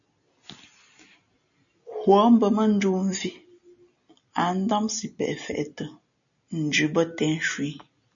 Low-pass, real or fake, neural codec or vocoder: 7.2 kHz; real; none